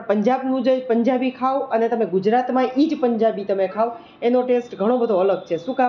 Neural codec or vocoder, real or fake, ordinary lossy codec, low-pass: none; real; none; 7.2 kHz